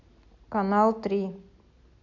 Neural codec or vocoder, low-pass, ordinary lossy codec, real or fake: none; 7.2 kHz; AAC, 48 kbps; real